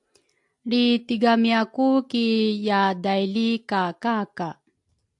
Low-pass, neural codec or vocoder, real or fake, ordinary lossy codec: 10.8 kHz; none; real; AAC, 64 kbps